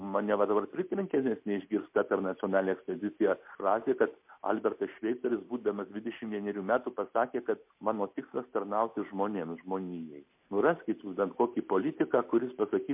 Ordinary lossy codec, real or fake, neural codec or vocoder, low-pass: MP3, 32 kbps; real; none; 3.6 kHz